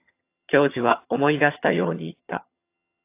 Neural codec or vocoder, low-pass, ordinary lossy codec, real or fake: vocoder, 22.05 kHz, 80 mel bands, HiFi-GAN; 3.6 kHz; AAC, 24 kbps; fake